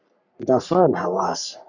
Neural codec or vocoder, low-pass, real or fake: codec, 44.1 kHz, 3.4 kbps, Pupu-Codec; 7.2 kHz; fake